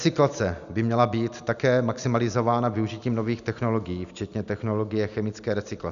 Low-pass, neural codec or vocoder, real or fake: 7.2 kHz; none; real